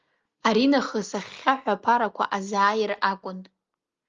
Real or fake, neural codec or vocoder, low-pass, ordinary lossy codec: real; none; 7.2 kHz; Opus, 32 kbps